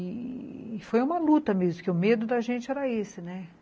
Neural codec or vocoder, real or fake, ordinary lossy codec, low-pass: none; real; none; none